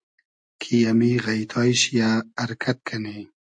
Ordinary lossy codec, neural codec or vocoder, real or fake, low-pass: AAC, 64 kbps; none; real; 9.9 kHz